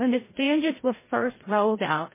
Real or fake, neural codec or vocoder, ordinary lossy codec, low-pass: fake; codec, 16 kHz, 0.5 kbps, FreqCodec, larger model; MP3, 16 kbps; 3.6 kHz